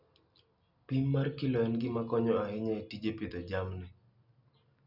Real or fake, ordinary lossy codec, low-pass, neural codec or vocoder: real; none; 5.4 kHz; none